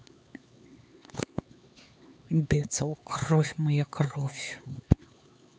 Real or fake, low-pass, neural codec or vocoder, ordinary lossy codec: fake; none; codec, 16 kHz, 4 kbps, X-Codec, HuBERT features, trained on LibriSpeech; none